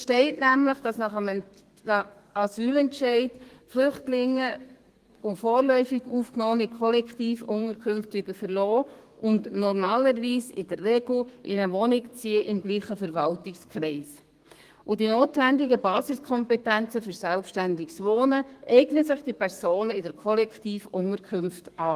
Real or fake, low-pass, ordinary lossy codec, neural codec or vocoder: fake; 14.4 kHz; Opus, 24 kbps; codec, 32 kHz, 1.9 kbps, SNAC